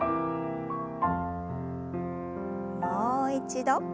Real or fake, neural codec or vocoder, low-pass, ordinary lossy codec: real; none; none; none